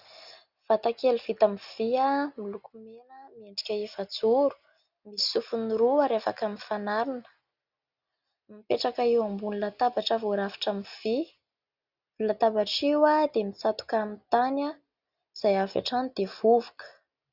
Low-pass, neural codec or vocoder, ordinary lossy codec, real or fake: 5.4 kHz; none; Opus, 64 kbps; real